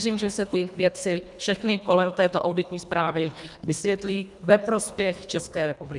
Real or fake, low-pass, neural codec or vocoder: fake; 10.8 kHz; codec, 24 kHz, 1.5 kbps, HILCodec